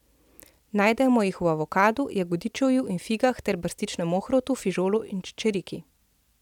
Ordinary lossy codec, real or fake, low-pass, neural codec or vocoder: none; real; 19.8 kHz; none